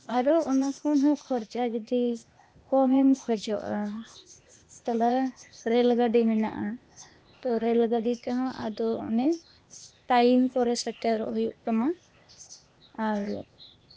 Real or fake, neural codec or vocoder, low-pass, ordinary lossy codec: fake; codec, 16 kHz, 0.8 kbps, ZipCodec; none; none